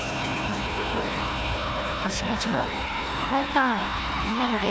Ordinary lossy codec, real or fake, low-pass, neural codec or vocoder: none; fake; none; codec, 16 kHz, 1 kbps, FunCodec, trained on Chinese and English, 50 frames a second